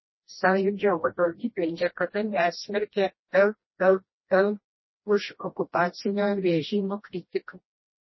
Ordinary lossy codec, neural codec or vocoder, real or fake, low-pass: MP3, 24 kbps; codec, 16 kHz, 1 kbps, FreqCodec, smaller model; fake; 7.2 kHz